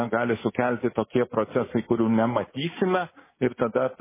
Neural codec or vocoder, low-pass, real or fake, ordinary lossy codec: none; 3.6 kHz; real; MP3, 16 kbps